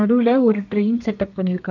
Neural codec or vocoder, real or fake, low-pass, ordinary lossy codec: codec, 32 kHz, 1.9 kbps, SNAC; fake; 7.2 kHz; none